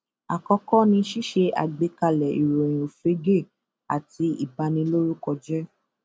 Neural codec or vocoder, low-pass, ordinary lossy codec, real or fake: none; none; none; real